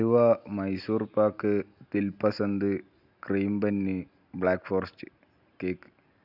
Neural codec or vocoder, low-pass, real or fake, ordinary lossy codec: none; 5.4 kHz; real; none